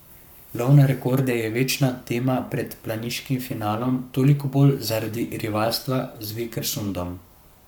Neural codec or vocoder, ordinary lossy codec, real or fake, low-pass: codec, 44.1 kHz, 7.8 kbps, Pupu-Codec; none; fake; none